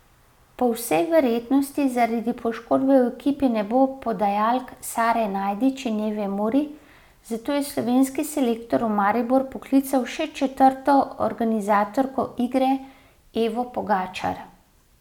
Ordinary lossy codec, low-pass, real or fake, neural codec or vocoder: none; 19.8 kHz; real; none